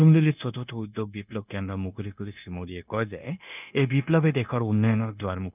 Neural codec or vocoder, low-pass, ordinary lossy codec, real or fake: codec, 16 kHz, 0.9 kbps, LongCat-Audio-Codec; 3.6 kHz; none; fake